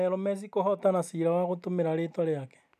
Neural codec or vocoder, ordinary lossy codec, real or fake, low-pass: none; none; real; 14.4 kHz